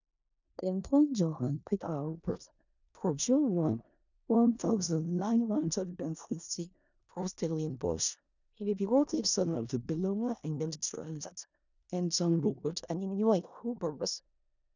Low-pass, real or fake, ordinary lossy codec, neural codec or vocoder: 7.2 kHz; fake; none; codec, 16 kHz in and 24 kHz out, 0.4 kbps, LongCat-Audio-Codec, four codebook decoder